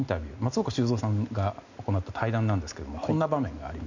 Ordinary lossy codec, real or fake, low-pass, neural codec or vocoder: none; real; 7.2 kHz; none